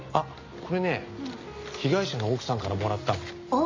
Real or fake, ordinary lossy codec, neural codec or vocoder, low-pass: real; MP3, 48 kbps; none; 7.2 kHz